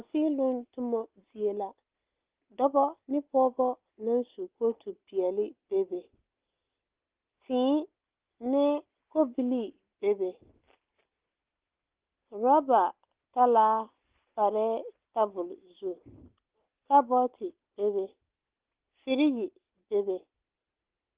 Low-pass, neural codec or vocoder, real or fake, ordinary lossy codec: 3.6 kHz; none; real; Opus, 16 kbps